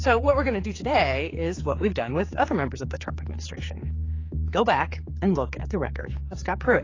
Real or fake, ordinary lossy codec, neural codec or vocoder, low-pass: fake; AAC, 32 kbps; codec, 16 kHz, 4 kbps, X-Codec, HuBERT features, trained on general audio; 7.2 kHz